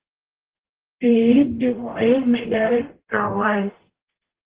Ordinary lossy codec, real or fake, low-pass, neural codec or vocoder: Opus, 16 kbps; fake; 3.6 kHz; codec, 44.1 kHz, 0.9 kbps, DAC